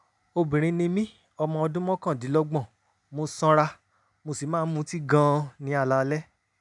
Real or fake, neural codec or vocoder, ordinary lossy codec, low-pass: real; none; none; 10.8 kHz